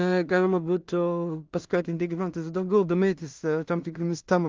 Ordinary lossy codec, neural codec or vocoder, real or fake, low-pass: Opus, 24 kbps; codec, 16 kHz in and 24 kHz out, 0.4 kbps, LongCat-Audio-Codec, two codebook decoder; fake; 7.2 kHz